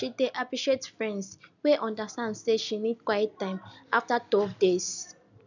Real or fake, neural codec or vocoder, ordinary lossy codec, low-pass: real; none; none; 7.2 kHz